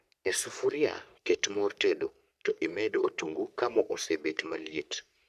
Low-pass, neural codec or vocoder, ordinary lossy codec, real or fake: 14.4 kHz; codec, 44.1 kHz, 3.4 kbps, Pupu-Codec; none; fake